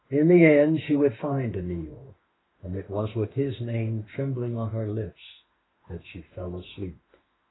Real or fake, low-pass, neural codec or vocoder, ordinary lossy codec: fake; 7.2 kHz; autoencoder, 48 kHz, 32 numbers a frame, DAC-VAE, trained on Japanese speech; AAC, 16 kbps